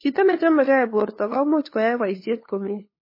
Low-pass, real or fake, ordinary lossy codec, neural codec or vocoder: 5.4 kHz; fake; MP3, 24 kbps; codec, 16 kHz, 2 kbps, FunCodec, trained on LibriTTS, 25 frames a second